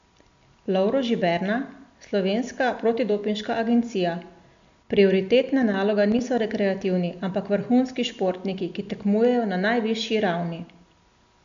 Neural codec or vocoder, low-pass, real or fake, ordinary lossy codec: none; 7.2 kHz; real; MP3, 64 kbps